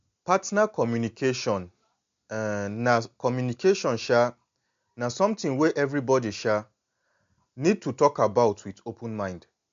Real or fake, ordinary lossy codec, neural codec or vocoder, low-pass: real; MP3, 48 kbps; none; 7.2 kHz